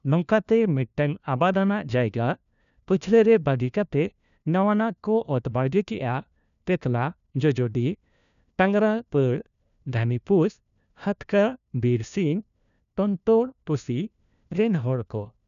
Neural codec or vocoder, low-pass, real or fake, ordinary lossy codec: codec, 16 kHz, 1 kbps, FunCodec, trained on LibriTTS, 50 frames a second; 7.2 kHz; fake; none